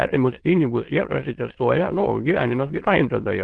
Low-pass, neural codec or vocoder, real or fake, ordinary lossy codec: 9.9 kHz; autoencoder, 22.05 kHz, a latent of 192 numbers a frame, VITS, trained on many speakers; fake; Opus, 16 kbps